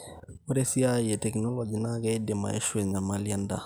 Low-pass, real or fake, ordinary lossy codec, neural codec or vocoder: none; real; none; none